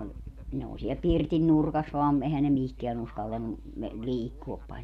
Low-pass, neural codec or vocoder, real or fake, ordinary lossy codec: 14.4 kHz; none; real; none